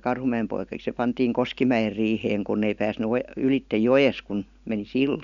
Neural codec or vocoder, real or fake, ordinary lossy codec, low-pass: none; real; MP3, 96 kbps; 7.2 kHz